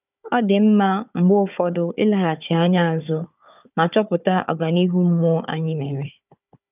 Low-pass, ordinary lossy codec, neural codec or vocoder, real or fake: 3.6 kHz; none; codec, 16 kHz, 4 kbps, FunCodec, trained on Chinese and English, 50 frames a second; fake